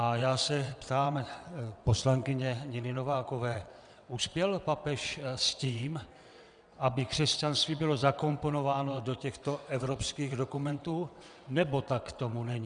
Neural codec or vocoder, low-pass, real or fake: vocoder, 22.05 kHz, 80 mel bands, WaveNeXt; 9.9 kHz; fake